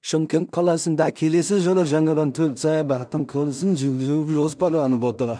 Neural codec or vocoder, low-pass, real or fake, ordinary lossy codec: codec, 16 kHz in and 24 kHz out, 0.4 kbps, LongCat-Audio-Codec, two codebook decoder; 9.9 kHz; fake; MP3, 96 kbps